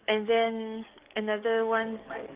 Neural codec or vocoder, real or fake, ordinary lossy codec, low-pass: codec, 44.1 kHz, 7.8 kbps, DAC; fake; Opus, 32 kbps; 3.6 kHz